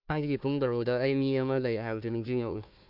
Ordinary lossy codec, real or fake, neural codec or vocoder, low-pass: MP3, 48 kbps; fake; codec, 16 kHz, 1 kbps, FunCodec, trained on Chinese and English, 50 frames a second; 5.4 kHz